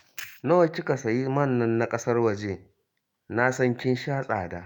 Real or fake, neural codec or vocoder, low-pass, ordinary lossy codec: fake; autoencoder, 48 kHz, 128 numbers a frame, DAC-VAE, trained on Japanese speech; 19.8 kHz; Opus, 64 kbps